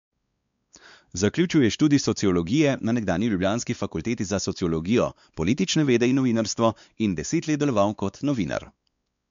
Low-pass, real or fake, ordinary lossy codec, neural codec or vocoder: 7.2 kHz; fake; MP3, 64 kbps; codec, 16 kHz, 4 kbps, X-Codec, WavLM features, trained on Multilingual LibriSpeech